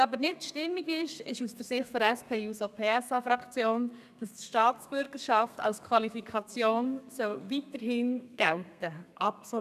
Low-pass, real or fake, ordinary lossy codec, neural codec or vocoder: 14.4 kHz; fake; none; codec, 32 kHz, 1.9 kbps, SNAC